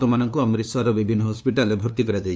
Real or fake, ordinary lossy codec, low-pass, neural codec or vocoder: fake; none; none; codec, 16 kHz, 2 kbps, FunCodec, trained on LibriTTS, 25 frames a second